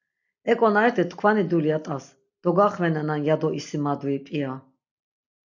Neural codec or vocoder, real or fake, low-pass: none; real; 7.2 kHz